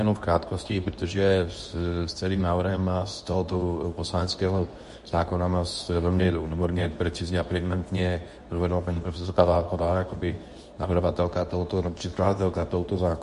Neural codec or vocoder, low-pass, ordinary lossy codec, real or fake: codec, 24 kHz, 0.9 kbps, WavTokenizer, medium speech release version 2; 10.8 kHz; MP3, 48 kbps; fake